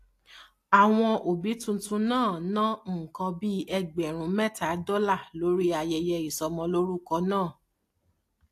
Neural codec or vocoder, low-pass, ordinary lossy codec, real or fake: none; 14.4 kHz; AAC, 64 kbps; real